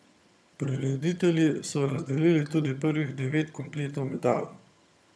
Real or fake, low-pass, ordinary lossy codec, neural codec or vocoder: fake; none; none; vocoder, 22.05 kHz, 80 mel bands, HiFi-GAN